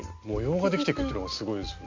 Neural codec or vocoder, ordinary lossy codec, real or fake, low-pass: none; MP3, 64 kbps; real; 7.2 kHz